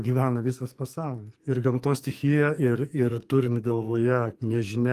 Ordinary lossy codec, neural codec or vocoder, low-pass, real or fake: Opus, 32 kbps; codec, 44.1 kHz, 2.6 kbps, SNAC; 14.4 kHz; fake